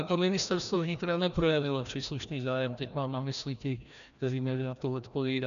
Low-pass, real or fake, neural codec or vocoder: 7.2 kHz; fake; codec, 16 kHz, 1 kbps, FreqCodec, larger model